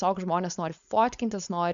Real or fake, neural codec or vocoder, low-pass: fake; codec, 16 kHz, 4.8 kbps, FACodec; 7.2 kHz